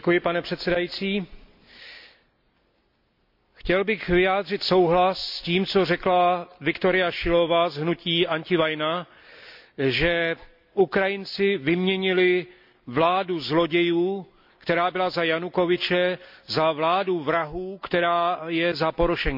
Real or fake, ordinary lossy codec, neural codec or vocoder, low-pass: real; MP3, 48 kbps; none; 5.4 kHz